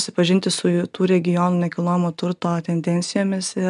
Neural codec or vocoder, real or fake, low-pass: none; real; 10.8 kHz